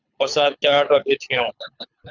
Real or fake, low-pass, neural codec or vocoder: fake; 7.2 kHz; codec, 24 kHz, 6 kbps, HILCodec